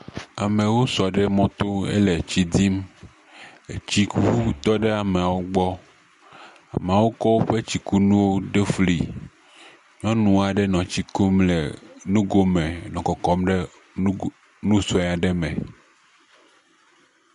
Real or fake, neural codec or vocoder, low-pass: real; none; 10.8 kHz